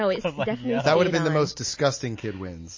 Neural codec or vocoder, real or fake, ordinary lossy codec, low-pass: none; real; MP3, 32 kbps; 7.2 kHz